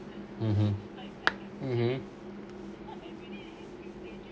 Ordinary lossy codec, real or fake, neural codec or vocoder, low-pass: none; real; none; none